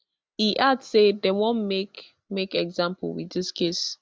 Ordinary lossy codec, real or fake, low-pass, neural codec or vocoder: none; real; none; none